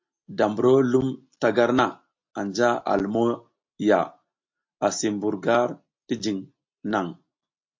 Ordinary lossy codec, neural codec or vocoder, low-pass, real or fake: MP3, 48 kbps; none; 7.2 kHz; real